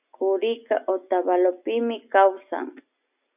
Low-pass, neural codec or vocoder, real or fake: 3.6 kHz; none; real